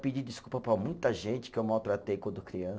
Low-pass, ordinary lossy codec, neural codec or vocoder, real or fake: none; none; none; real